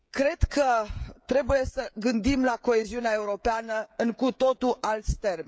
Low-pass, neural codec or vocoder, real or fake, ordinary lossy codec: none; codec, 16 kHz, 16 kbps, FreqCodec, smaller model; fake; none